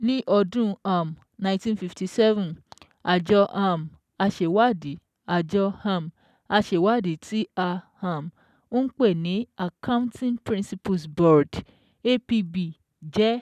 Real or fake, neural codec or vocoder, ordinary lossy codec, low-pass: real; none; none; 14.4 kHz